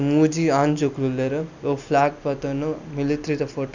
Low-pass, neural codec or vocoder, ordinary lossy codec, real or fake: 7.2 kHz; none; none; real